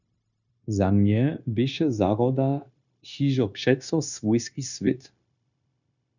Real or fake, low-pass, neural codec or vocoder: fake; 7.2 kHz; codec, 16 kHz, 0.9 kbps, LongCat-Audio-Codec